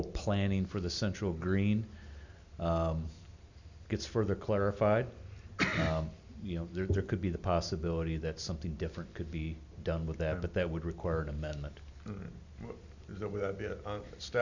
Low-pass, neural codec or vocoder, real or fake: 7.2 kHz; none; real